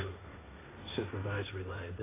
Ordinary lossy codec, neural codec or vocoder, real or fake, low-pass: AAC, 16 kbps; codec, 16 kHz, 1.1 kbps, Voila-Tokenizer; fake; 3.6 kHz